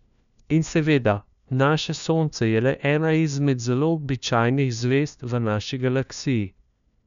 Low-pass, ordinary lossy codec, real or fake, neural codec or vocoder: 7.2 kHz; none; fake; codec, 16 kHz, 1 kbps, FunCodec, trained on LibriTTS, 50 frames a second